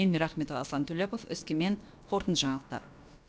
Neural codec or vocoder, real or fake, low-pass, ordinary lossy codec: codec, 16 kHz, about 1 kbps, DyCAST, with the encoder's durations; fake; none; none